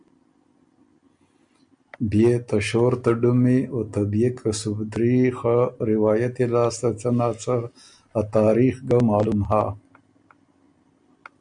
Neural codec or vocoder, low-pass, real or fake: none; 9.9 kHz; real